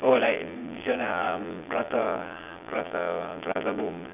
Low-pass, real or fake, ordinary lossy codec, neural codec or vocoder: 3.6 kHz; fake; none; vocoder, 22.05 kHz, 80 mel bands, Vocos